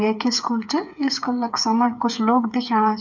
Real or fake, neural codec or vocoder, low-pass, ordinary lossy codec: fake; codec, 16 kHz, 8 kbps, FreqCodec, smaller model; 7.2 kHz; none